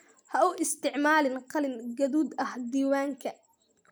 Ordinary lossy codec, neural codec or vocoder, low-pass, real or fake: none; none; none; real